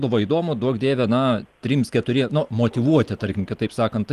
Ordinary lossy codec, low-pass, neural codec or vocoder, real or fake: Opus, 24 kbps; 14.4 kHz; none; real